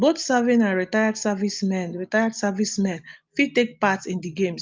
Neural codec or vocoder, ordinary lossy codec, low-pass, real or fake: none; Opus, 32 kbps; 7.2 kHz; real